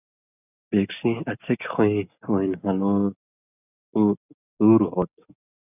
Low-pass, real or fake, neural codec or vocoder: 3.6 kHz; real; none